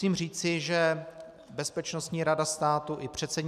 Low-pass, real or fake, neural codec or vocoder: 14.4 kHz; real; none